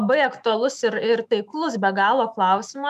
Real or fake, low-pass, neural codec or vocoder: fake; 14.4 kHz; autoencoder, 48 kHz, 128 numbers a frame, DAC-VAE, trained on Japanese speech